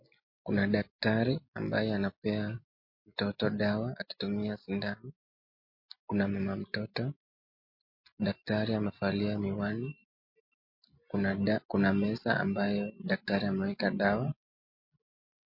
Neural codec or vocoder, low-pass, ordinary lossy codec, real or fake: none; 5.4 kHz; MP3, 32 kbps; real